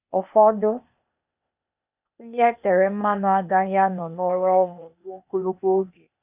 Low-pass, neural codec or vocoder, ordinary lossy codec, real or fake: 3.6 kHz; codec, 16 kHz, 0.8 kbps, ZipCodec; none; fake